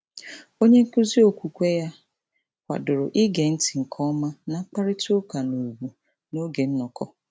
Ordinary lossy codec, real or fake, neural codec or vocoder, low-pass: none; real; none; none